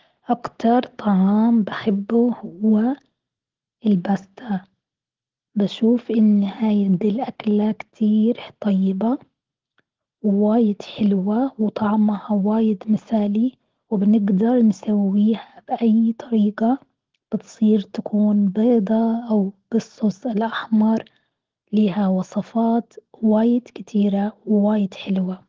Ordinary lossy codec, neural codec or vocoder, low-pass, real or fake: Opus, 16 kbps; none; 7.2 kHz; real